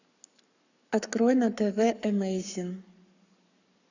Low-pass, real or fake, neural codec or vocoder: 7.2 kHz; fake; codec, 44.1 kHz, 7.8 kbps, Pupu-Codec